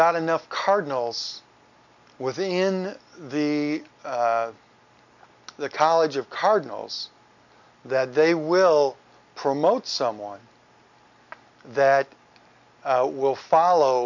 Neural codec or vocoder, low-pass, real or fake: none; 7.2 kHz; real